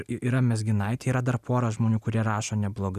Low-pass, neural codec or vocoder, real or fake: 14.4 kHz; none; real